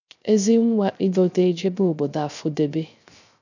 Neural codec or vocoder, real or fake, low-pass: codec, 16 kHz, 0.3 kbps, FocalCodec; fake; 7.2 kHz